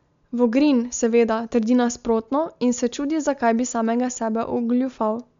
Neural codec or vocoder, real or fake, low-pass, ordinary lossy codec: none; real; 7.2 kHz; MP3, 64 kbps